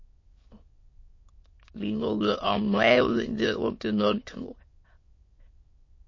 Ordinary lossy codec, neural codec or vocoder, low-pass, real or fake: MP3, 32 kbps; autoencoder, 22.05 kHz, a latent of 192 numbers a frame, VITS, trained on many speakers; 7.2 kHz; fake